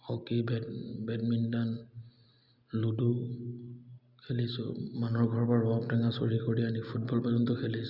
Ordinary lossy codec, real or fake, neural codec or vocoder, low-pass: Opus, 64 kbps; real; none; 5.4 kHz